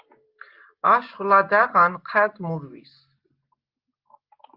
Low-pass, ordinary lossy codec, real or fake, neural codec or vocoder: 5.4 kHz; Opus, 32 kbps; real; none